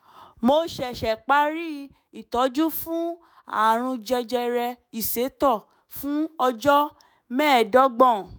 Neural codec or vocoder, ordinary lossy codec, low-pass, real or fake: autoencoder, 48 kHz, 128 numbers a frame, DAC-VAE, trained on Japanese speech; none; none; fake